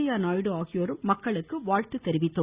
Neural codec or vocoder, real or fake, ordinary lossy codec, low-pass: none; real; Opus, 64 kbps; 3.6 kHz